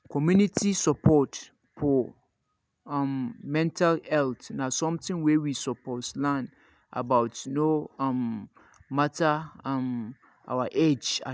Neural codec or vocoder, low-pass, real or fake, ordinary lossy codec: none; none; real; none